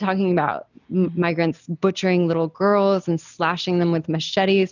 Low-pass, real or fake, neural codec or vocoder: 7.2 kHz; real; none